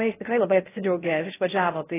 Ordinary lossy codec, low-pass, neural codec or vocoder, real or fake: AAC, 16 kbps; 3.6 kHz; codec, 16 kHz in and 24 kHz out, 0.6 kbps, FocalCodec, streaming, 4096 codes; fake